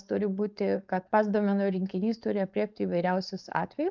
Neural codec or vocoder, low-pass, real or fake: codec, 16 kHz, 4.8 kbps, FACodec; 7.2 kHz; fake